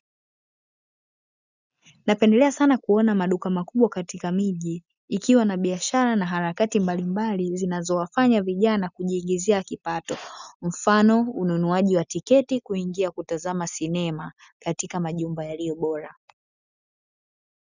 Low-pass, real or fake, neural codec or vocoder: 7.2 kHz; real; none